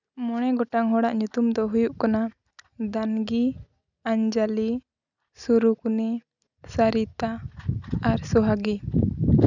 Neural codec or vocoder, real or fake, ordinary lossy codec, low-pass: none; real; none; 7.2 kHz